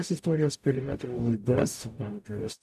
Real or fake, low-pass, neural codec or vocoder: fake; 14.4 kHz; codec, 44.1 kHz, 0.9 kbps, DAC